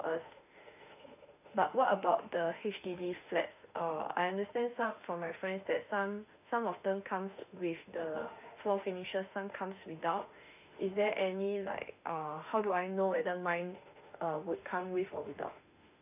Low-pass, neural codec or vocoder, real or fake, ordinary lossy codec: 3.6 kHz; autoencoder, 48 kHz, 32 numbers a frame, DAC-VAE, trained on Japanese speech; fake; none